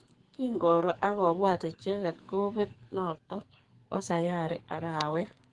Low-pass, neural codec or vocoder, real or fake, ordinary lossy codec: 10.8 kHz; codec, 44.1 kHz, 2.6 kbps, SNAC; fake; Opus, 24 kbps